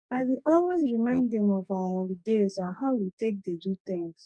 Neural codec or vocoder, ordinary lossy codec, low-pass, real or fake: codec, 44.1 kHz, 2.6 kbps, DAC; none; 9.9 kHz; fake